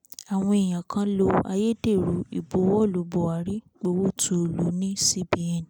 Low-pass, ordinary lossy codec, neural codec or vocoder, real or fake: none; none; none; real